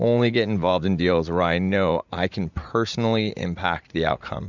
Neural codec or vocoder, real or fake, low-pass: vocoder, 44.1 kHz, 80 mel bands, Vocos; fake; 7.2 kHz